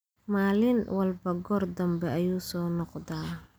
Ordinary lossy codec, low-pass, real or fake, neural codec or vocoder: none; none; real; none